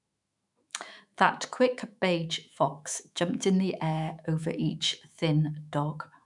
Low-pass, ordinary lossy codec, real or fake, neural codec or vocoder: 10.8 kHz; none; fake; autoencoder, 48 kHz, 128 numbers a frame, DAC-VAE, trained on Japanese speech